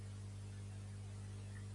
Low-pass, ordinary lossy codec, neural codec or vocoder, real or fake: 10.8 kHz; AAC, 32 kbps; none; real